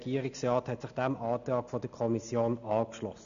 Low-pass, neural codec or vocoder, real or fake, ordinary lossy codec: 7.2 kHz; none; real; none